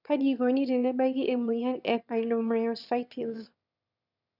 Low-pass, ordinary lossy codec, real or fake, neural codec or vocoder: 5.4 kHz; none; fake; autoencoder, 22.05 kHz, a latent of 192 numbers a frame, VITS, trained on one speaker